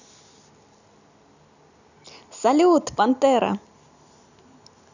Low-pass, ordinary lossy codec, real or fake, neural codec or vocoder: 7.2 kHz; none; real; none